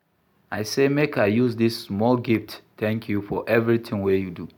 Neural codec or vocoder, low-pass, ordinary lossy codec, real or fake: none; 19.8 kHz; none; real